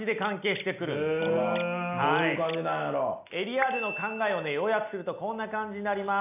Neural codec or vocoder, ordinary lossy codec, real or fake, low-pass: none; none; real; 3.6 kHz